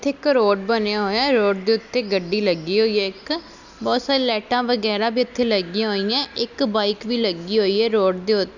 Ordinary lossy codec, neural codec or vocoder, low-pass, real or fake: none; none; 7.2 kHz; real